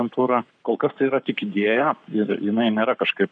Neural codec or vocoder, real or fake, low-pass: codec, 44.1 kHz, 7.8 kbps, Pupu-Codec; fake; 9.9 kHz